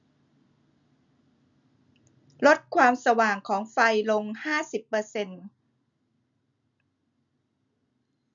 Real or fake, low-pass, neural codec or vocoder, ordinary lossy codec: real; 7.2 kHz; none; none